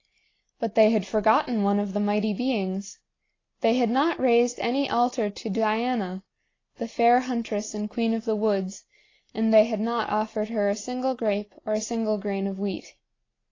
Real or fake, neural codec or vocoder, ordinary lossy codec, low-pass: real; none; AAC, 32 kbps; 7.2 kHz